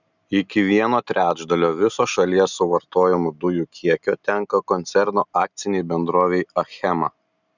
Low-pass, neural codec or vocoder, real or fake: 7.2 kHz; none; real